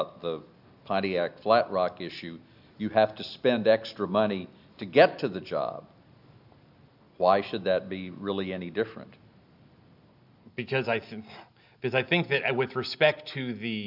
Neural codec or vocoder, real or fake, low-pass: none; real; 5.4 kHz